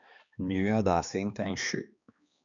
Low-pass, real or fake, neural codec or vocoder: 7.2 kHz; fake; codec, 16 kHz, 2 kbps, X-Codec, HuBERT features, trained on balanced general audio